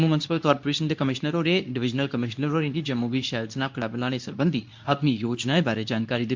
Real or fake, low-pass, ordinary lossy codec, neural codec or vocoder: fake; 7.2 kHz; MP3, 64 kbps; codec, 24 kHz, 0.9 kbps, WavTokenizer, medium speech release version 2